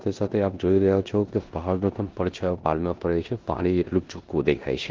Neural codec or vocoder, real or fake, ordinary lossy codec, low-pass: codec, 16 kHz in and 24 kHz out, 0.9 kbps, LongCat-Audio-Codec, four codebook decoder; fake; Opus, 16 kbps; 7.2 kHz